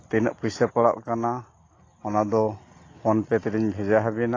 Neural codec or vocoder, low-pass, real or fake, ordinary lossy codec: none; 7.2 kHz; real; AAC, 32 kbps